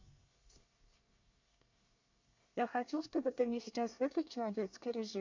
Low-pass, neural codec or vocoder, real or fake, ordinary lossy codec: 7.2 kHz; codec, 24 kHz, 1 kbps, SNAC; fake; MP3, 48 kbps